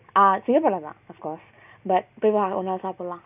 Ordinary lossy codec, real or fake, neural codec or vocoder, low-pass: none; real; none; 3.6 kHz